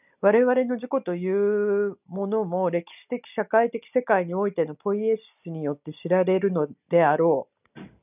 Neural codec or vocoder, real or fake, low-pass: none; real; 3.6 kHz